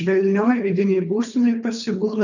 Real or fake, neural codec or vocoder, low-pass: fake; codec, 24 kHz, 3 kbps, HILCodec; 7.2 kHz